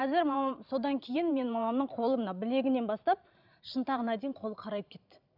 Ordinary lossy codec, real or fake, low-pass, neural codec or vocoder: none; fake; 5.4 kHz; vocoder, 44.1 kHz, 128 mel bands every 512 samples, BigVGAN v2